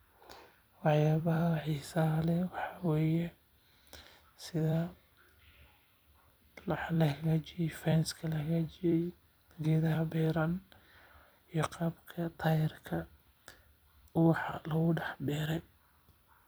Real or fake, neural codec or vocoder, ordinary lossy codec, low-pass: fake; vocoder, 44.1 kHz, 128 mel bands every 256 samples, BigVGAN v2; none; none